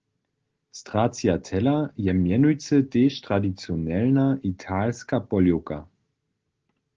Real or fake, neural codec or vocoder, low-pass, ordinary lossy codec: real; none; 7.2 kHz; Opus, 16 kbps